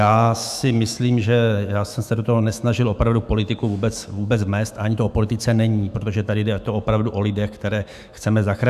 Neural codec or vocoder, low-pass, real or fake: autoencoder, 48 kHz, 128 numbers a frame, DAC-VAE, trained on Japanese speech; 14.4 kHz; fake